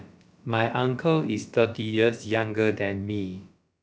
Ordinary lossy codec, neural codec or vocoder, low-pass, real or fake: none; codec, 16 kHz, about 1 kbps, DyCAST, with the encoder's durations; none; fake